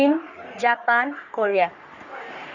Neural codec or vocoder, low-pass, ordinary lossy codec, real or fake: codec, 44.1 kHz, 3.4 kbps, Pupu-Codec; 7.2 kHz; Opus, 64 kbps; fake